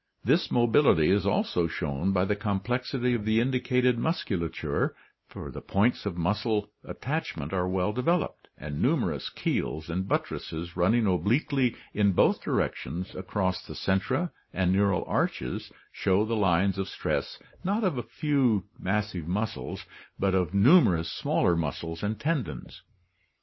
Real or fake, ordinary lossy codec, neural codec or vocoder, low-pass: real; MP3, 24 kbps; none; 7.2 kHz